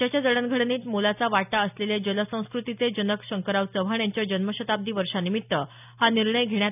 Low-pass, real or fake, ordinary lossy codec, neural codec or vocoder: 3.6 kHz; real; none; none